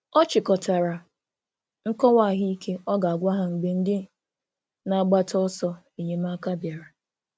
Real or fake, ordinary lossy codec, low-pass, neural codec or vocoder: real; none; none; none